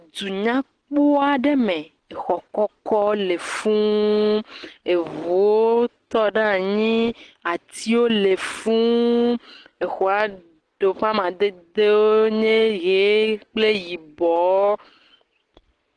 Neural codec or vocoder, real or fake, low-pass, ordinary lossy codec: none; real; 9.9 kHz; Opus, 16 kbps